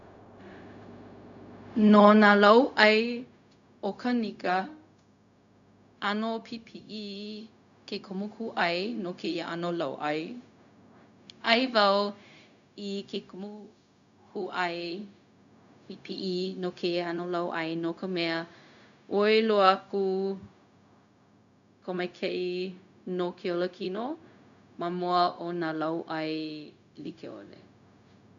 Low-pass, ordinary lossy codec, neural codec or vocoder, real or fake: 7.2 kHz; none; codec, 16 kHz, 0.4 kbps, LongCat-Audio-Codec; fake